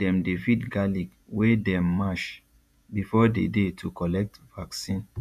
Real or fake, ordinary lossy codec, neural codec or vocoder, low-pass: fake; none; vocoder, 48 kHz, 128 mel bands, Vocos; 14.4 kHz